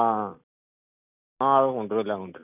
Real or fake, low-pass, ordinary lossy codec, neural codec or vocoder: real; 3.6 kHz; none; none